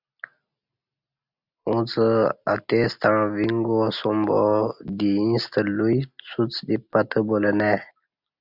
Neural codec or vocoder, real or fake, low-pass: none; real; 5.4 kHz